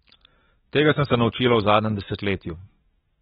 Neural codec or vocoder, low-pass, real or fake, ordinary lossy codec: codec, 44.1 kHz, 7.8 kbps, DAC; 19.8 kHz; fake; AAC, 16 kbps